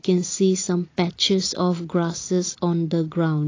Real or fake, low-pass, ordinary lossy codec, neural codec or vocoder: real; 7.2 kHz; AAC, 32 kbps; none